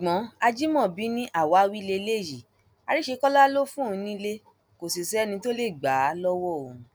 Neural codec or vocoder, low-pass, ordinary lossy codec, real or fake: none; none; none; real